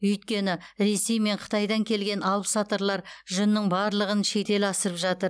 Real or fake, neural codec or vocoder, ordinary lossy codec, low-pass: real; none; none; none